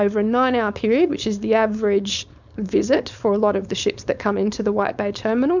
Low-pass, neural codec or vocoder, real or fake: 7.2 kHz; codec, 16 kHz, 4.8 kbps, FACodec; fake